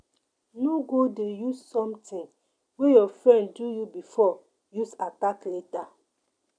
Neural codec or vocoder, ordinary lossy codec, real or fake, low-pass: none; none; real; 9.9 kHz